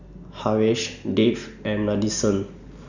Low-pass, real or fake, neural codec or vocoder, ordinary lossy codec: 7.2 kHz; real; none; none